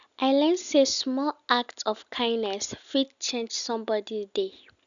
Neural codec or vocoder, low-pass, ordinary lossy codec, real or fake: none; 7.2 kHz; none; real